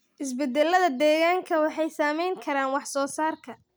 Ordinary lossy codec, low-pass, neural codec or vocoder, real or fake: none; none; none; real